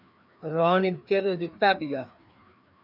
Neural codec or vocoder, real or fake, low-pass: codec, 16 kHz, 2 kbps, FreqCodec, larger model; fake; 5.4 kHz